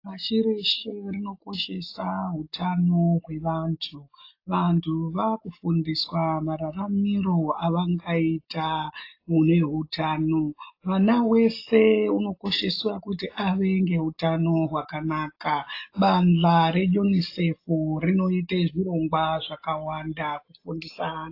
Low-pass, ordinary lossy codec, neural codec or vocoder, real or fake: 5.4 kHz; AAC, 32 kbps; none; real